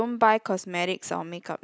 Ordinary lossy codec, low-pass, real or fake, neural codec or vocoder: none; none; real; none